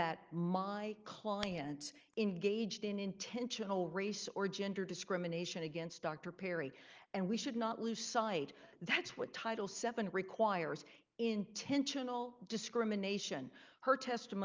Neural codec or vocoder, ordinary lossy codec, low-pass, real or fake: none; Opus, 24 kbps; 7.2 kHz; real